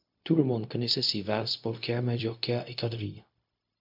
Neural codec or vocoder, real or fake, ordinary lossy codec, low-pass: codec, 16 kHz, 0.4 kbps, LongCat-Audio-Codec; fake; MP3, 48 kbps; 5.4 kHz